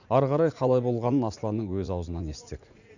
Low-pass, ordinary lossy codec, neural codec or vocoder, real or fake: 7.2 kHz; none; vocoder, 44.1 kHz, 80 mel bands, Vocos; fake